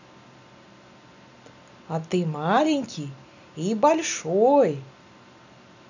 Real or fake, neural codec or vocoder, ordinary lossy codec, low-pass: real; none; none; 7.2 kHz